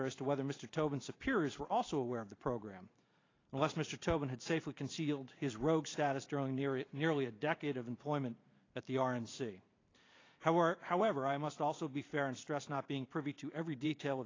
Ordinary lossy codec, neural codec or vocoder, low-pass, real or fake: AAC, 32 kbps; vocoder, 44.1 kHz, 128 mel bands every 512 samples, BigVGAN v2; 7.2 kHz; fake